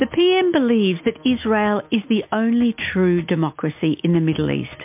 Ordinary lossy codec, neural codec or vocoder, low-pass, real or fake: MP3, 32 kbps; none; 3.6 kHz; real